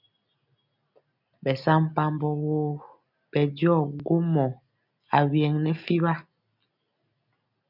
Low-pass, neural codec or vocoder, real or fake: 5.4 kHz; none; real